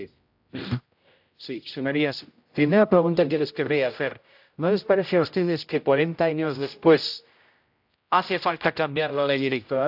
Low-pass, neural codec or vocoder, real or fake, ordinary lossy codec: 5.4 kHz; codec, 16 kHz, 0.5 kbps, X-Codec, HuBERT features, trained on general audio; fake; AAC, 48 kbps